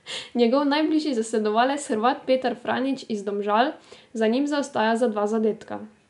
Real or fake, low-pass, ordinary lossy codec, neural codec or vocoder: real; 10.8 kHz; none; none